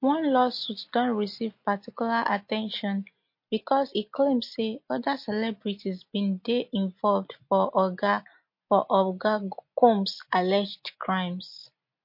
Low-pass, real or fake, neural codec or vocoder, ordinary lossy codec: 5.4 kHz; real; none; MP3, 32 kbps